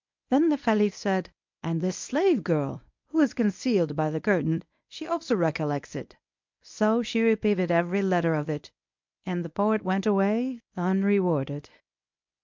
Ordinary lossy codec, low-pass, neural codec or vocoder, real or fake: MP3, 64 kbps; 7.2 kHz; codec, 24 kHz, 0.9 kbps, WavTokenizer, medium speech release version 1; fake